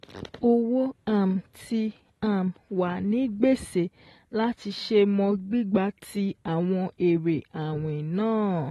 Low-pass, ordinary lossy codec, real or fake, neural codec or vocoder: 19.8 kHz; AAC, 32 kbps; real; none